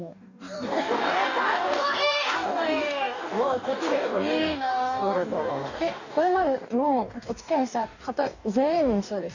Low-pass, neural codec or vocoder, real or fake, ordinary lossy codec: 7.2 kHz; codec, 44.1 kHz, 2.6 kbps, DAC; fake; none